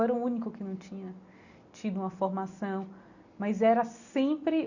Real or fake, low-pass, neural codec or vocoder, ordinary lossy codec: real; 7.2 kHz; none; none